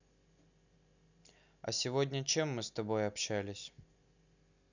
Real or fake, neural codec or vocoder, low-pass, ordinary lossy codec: real; none; 7.2 kHz; none